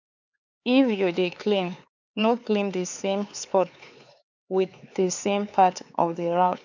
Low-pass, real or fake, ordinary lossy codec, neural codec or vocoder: 7.2 kHz; fake; none; codec, 16 kHz, 4 kbps, X-Codec, HuBERT features, trained on LibriSpeech